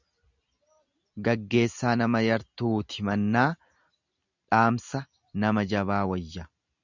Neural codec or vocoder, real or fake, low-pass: none; real; 7.2 kHz